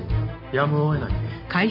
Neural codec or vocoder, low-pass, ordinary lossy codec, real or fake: none; 5.4 kHz; none; real